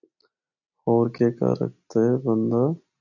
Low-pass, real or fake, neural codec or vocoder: 7.2 kHz; real; none